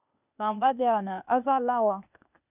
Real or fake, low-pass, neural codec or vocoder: fake; 3.6 kHz; codec, 16 kHz, 0.8 kbps, ZipCodec